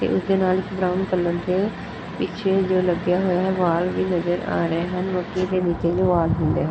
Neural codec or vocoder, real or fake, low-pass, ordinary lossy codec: none; real; none; none